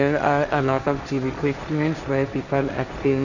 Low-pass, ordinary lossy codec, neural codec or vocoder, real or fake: 7.2 kHz; none; codec, 16 kHz, 1.1 kbps, Voila-Tokenizer; fake